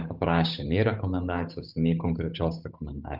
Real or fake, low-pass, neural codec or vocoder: fake; 5.4 kHz; codec, 16 kHz, 8 kbps, FunCodec, trained on Chinese and English, 25 frames a second